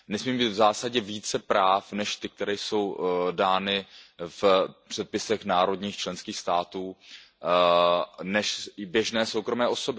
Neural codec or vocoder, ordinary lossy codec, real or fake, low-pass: none; none; real; none